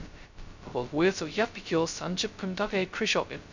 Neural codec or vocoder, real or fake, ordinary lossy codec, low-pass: codec, 16 kHz, 0.2 kbps, FocalCodec; fake; none; 7.2 kHz